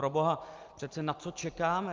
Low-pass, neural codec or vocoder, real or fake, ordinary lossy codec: 7.2 kHz; none; real; Opus, 24 kbps